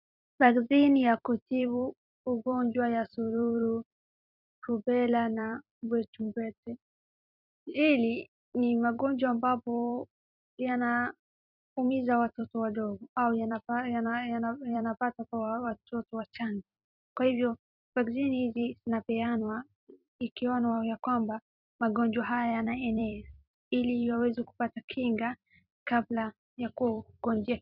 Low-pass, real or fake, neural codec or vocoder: 5.4 kHz; fake; vocoder, 44.1 kHz, 128 mel bands every 256 samples, BigVGAN v2